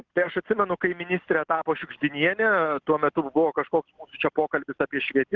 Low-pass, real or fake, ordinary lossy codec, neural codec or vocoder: 7.2 kHz; real; Opus, 16 kbps; none